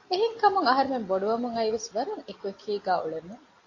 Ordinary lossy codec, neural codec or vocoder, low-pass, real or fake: AAC, 32 kbps; none; 7.2 kHz; real